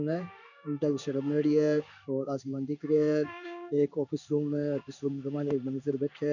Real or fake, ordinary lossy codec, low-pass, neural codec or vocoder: fake; none; 7.2 kHz; codec, 16 kHz in and 24 kHz out, 1 kbps, XY-Tokenizer